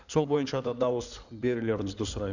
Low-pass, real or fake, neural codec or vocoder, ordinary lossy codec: 7.2 kHz; fake; codec, 16 kHz in and 24 kHz out, 2.2 kbps, FireRedTTS-2 codec; none